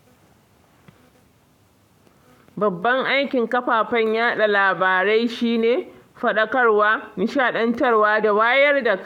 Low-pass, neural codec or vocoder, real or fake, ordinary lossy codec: 19.8 kHz; codec, 44.1 kHz, 7.8 kbps, Pupu-Codec; fake; none